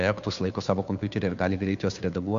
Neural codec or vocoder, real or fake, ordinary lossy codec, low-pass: codec, 16 kHz, 2 kbps, FunCodec, trained on Chinese and English, 25 frames a second; fake; Opus, 64 kbps; 7.2 kHz